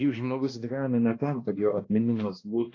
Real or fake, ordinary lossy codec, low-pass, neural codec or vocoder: fake; AAC, 32 kbps; 7.2 kHz; codec, 16 kHz, 1 kbps, X-Codec, HuBERT features, trained on balanced general audio